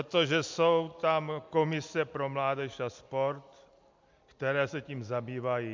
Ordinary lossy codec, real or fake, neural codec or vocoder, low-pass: MP3, 64 kbps; real; none; 7.2 kHz